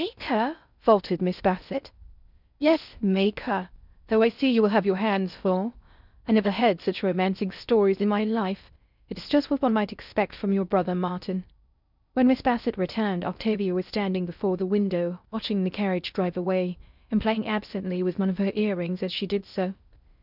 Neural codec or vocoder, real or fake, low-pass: codec, 16 kHz in and 24 kHz out, 0.6 kbps, FocalCodec, streaming, 4096 codes; fake; 5.4 kHz